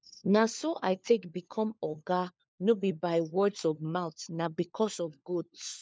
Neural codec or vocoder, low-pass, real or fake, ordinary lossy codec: codec, 16 kHz, 4 kbps, FunCodec, trained on LibriTTS, 50 frames a second; none; fake; none